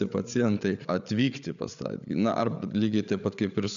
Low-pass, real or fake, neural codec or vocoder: 7.2 kHz; fake; codec, 16 kHz, 8 kbps, FreqCodec, larger model